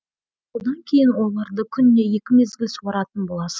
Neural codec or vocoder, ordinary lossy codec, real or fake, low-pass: none; none; real; none